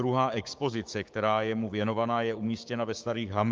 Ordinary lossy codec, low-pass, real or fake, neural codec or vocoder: Opus, 32 kbps; 7.2 kHz; fake; codec, 16 kHz, 6 kbps, DAC